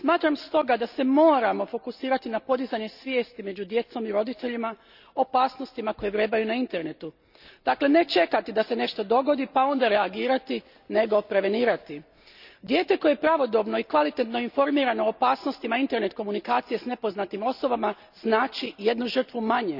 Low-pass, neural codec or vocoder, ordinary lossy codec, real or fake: 5.4 kHz; none; none; real